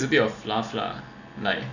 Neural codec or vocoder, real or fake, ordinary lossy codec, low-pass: none; real; none; 7.2 kHz